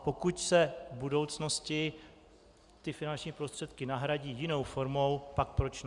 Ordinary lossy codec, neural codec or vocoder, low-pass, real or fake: Opus, 64 kbps; none; 10.8 kHz; real